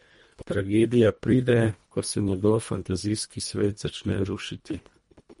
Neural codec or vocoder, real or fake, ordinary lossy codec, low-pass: codec, 24 kHz, 1.5 kbps, HILCodec; fake; MP3, 48 kbps; 10.8 kHz